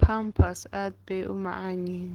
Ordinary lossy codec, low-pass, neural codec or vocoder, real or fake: Opus, 16 kbps; 19.8 kHz; codec, 44.1 kHz, 7.8 kbps, Pupu-Codec; fake